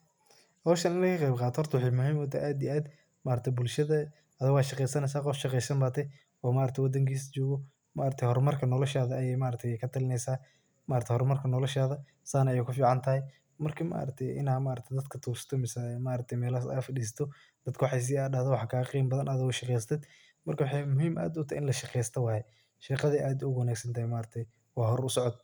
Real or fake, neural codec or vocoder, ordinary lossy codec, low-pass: real; none; none; none